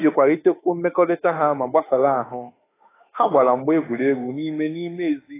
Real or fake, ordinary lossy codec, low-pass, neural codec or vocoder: fake; AAC, 16 kbps; 3.6 kHz; autoencoder, 48 kHz, 32 numbers a frame, DAC-VAE, trained on Japanese speech